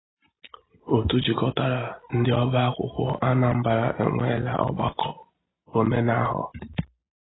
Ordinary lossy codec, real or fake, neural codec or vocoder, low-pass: AAC, 16 kbps; real; none; 7.2 kHz